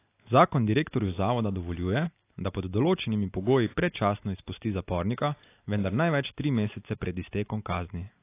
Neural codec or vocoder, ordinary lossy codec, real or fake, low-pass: none; AAC, 24 kbps; real; 3.6 kHz